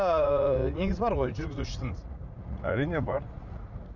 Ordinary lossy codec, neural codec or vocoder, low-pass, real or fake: none; vocoder, 44.1 kHz, 80 mel bands, Vocos; 7.2 kHz; fake